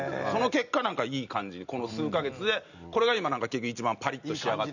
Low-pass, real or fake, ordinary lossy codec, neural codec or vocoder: 7.2 kHz; real; none; none